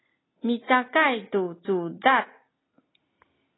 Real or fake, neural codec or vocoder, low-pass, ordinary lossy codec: real; none; 7.2 kHz; AAC, 16 kbps